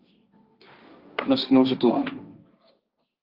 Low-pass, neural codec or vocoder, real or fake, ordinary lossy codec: 5.4 kHz; codec, 16 kHz, 1.1 kbps, Voila-Tokenizer; fake; Opus, 32 kbps